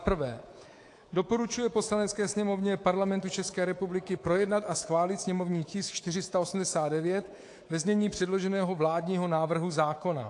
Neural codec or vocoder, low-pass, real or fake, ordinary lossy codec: codec, 24 kHz, 3.1 kbps, DualCodec; 10.8 kHz; fake; AAC, 48 kbps